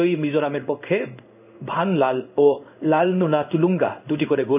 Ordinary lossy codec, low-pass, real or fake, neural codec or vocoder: none; 3.6 kHz; fake; codec, 16 kHz in and 24 kHz out, 1 kbps, XY-Tokenizer